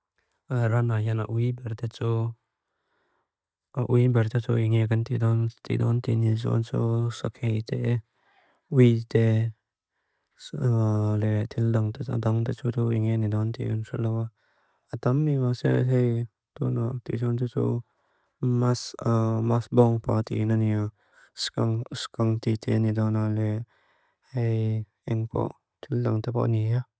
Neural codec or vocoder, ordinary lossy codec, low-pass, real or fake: none; none; none; real